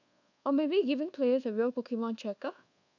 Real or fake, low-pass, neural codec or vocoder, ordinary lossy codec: fake; 7.2 kHz; codec, 24 kHz, 1.2 kbps, DualCodec; none